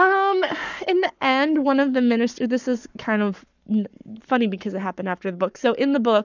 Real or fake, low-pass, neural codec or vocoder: fake; 7.2 kHz; codec, 44.1 kHz, 7.8 kbps, Pupu-Codec